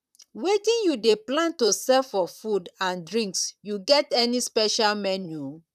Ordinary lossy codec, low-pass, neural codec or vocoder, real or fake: none; 14.4 kHz; vocoder, 44.1 kHz, 128 mel bands, Pupu-Vocoder; fake